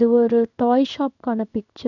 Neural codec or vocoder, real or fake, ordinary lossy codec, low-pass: codec, 16 kHz in and 24 kHz out, 1 kbps, XY-Tokenizer; fake; none; 7.2 kHz